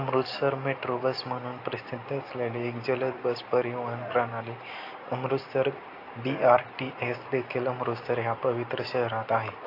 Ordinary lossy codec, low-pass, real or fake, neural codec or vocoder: AAC, 32 kbps; 5.4 kHz; real; none